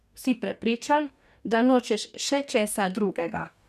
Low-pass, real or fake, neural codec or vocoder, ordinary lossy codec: 14.4 kHz; fake; codec, 44.1 kHz, 2.6 kbps, DAC; none